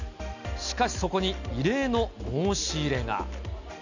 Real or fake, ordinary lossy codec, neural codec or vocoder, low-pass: real; none; none; 7.2 kHz